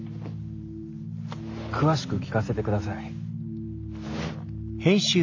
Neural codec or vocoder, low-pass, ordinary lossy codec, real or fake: none; 7.2 kHz; AAC, 48 kbps; real